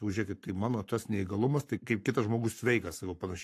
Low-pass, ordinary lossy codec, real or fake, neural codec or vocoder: 14.4 kHz; AAC, 64 kbps; fake; codec, 44.1 kHz, 7.8 kbps, Pupu-Codec